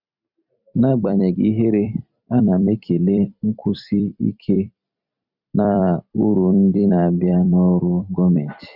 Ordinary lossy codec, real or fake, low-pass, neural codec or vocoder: none; fake; 5.4 kHz; vocoder, 44.1 kHz, 128 mel bands every 256 samples, BigVGAN v2